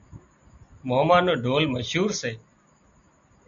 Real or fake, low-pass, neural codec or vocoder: real; 7.2 kHz; none